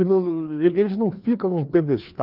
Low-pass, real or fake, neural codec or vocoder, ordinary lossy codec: 5.4 kHz; fake; codec, 16 kHz, 2 kbps, FreqCodec, larger model; Opus, 32 kbps